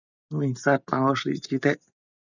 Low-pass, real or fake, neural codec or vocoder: 7.2 kHz; real; none